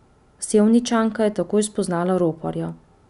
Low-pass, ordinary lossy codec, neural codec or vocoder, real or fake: 10.8 kHz; none; none; real